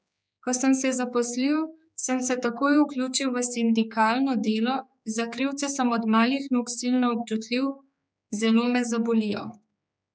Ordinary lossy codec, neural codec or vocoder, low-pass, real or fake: none; codec, 16 kHz, 4 kbps, X-Codec, HuBERT features, trained on general audio; none; fake